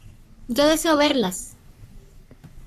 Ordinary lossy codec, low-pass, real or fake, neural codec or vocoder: AAC, 96 kbps; 14.4 kHz; fake; codec, 44.1 kHz, 3.4 kbps, Pupu-Codec